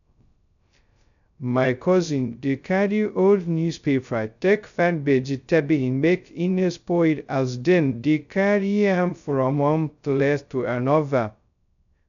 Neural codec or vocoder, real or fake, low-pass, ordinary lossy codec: codec, 16 kHz, 0.2 kbps, FocalCodec; fake; 7.2 kHz; none